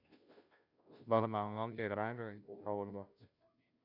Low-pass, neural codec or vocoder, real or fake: 5.4 kHz; codec, 16 kHz, 0.5 kbps, FunCodec, trained on Chinese and English, 25 frames a second; fake